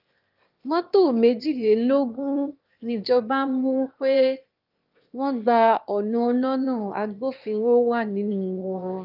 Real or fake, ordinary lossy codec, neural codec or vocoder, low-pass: fake; Opus, 24 kbps; autoencoder, 22.05 kHz, a latent of 192 numbers a frame, VITS, trained on one speaker; 5.4 kHz